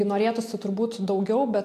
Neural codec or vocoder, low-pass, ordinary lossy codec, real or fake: none; 14.4 kHz; AAC, 64 kbps; real